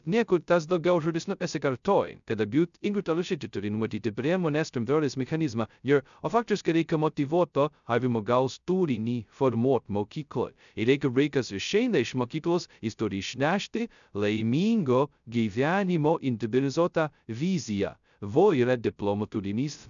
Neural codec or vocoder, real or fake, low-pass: codec, 16 kHz, 0.2 kbps, FocalCodec; fake; 7.2 kHz